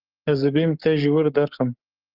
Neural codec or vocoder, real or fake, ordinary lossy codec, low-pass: codec, 44.1 kHz, 7.8 kbps, Pupu-Codec; fake; Opus, 32 kbps; 5.4 kHz